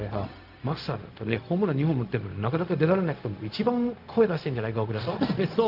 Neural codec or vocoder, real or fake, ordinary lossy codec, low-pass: codec, 16 kHz, 0.4 kbps, LongCat-Audio-Codec; fake; Opus, 32 kbps; 5.4 kHz